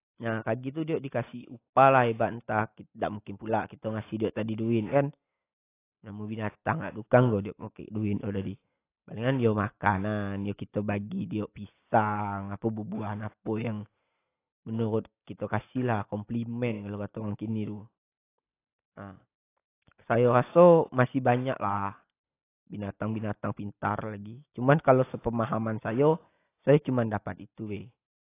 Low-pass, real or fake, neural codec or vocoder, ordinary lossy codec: 3.6 kHz; real; none; AAC, 24 kbps